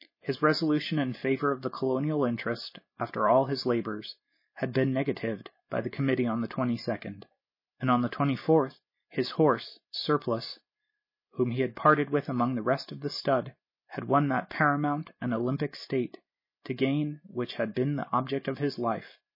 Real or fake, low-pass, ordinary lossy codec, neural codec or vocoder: real; 5.4 kHz; MP3, 24 kbps; none